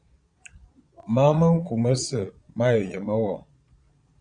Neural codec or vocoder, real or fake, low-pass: vocoder, 22.05 kHz, 80 mel bands, Vocos; fake; 9.9 kHz